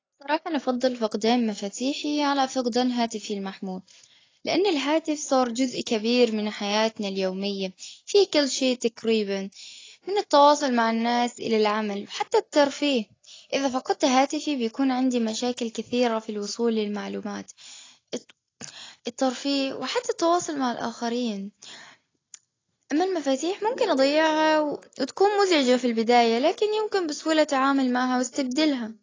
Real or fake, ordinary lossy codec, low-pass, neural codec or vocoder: real; AAC, 32 kbps; 7.2 kHz; none